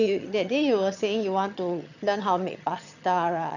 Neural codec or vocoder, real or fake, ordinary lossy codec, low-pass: vocoder, 22.05 kHz, 80 mel bands, HiFi-GAN; fake; none; 7.2 kHz